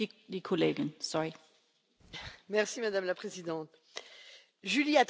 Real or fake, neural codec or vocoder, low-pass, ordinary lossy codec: real; none; none; none